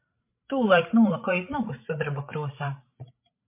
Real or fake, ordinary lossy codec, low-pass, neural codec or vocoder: fake; MP3, 24 kbps; 3.6 kHz; codec, 16 kHz, 16 kbps, FreqCodec, larger model